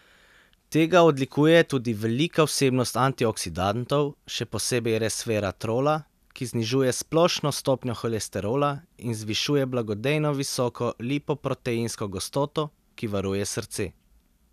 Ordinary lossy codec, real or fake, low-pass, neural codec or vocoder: none; real; 14.4 kHz; none